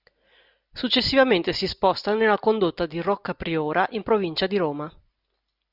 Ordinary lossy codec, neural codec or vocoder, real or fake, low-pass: Opus, 64 kbps; none; real; 5.4 kHz